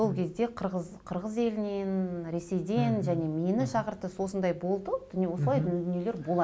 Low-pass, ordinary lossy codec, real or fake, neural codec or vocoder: none; none; real; none